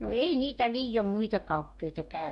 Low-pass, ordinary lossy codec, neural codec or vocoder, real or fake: 10.8 kHz; none; codec, 44.1 kHz, 2.6 kbps, DAC; fake